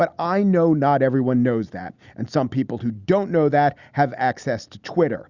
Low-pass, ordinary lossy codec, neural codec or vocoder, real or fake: 7.2 kHz; Opus, 64 kbps; none; real